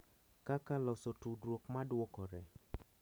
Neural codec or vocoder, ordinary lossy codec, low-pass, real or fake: vocoder, 44.1 kHz, 128 mel bands every 512 samples, BigVGAN v2; none; none; fake